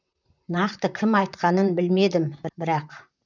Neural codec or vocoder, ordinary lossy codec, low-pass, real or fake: vocoder, 44.1 kHz, 128 mel bands, Pupu-Vocoder; none; 7.2 kHz; fake